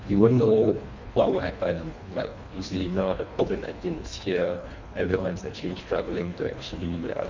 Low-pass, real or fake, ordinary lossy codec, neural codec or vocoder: 7.2 kHz; fake; MP3, 48 kbps; codec, 24 kHz, 1.5 kbps, HILCodec